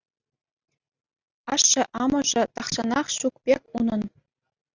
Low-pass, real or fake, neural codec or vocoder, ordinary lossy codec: 7.2 kHz; real; none; Opus, 64 kbps